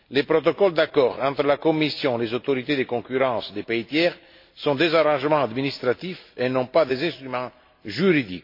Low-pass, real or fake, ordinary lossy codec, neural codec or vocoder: 5.4 kHz; real; MP3, 32 kbps; none